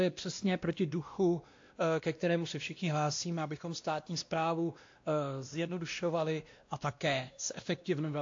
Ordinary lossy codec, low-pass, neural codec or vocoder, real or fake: AAC, 48 kbps; 7.2 kHz; codec, 16 kHz, 1 kbps, X-Codec, WavLM features, trained on Multilingual LibriSpeech; fake